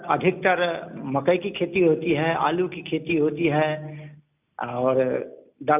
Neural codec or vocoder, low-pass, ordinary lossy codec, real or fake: none; 3.6 kHz; none; real